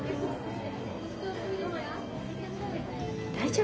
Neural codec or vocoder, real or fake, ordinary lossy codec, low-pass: none; real; none; none